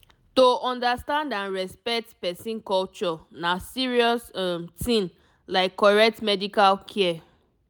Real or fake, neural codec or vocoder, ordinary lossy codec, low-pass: real; none; none; none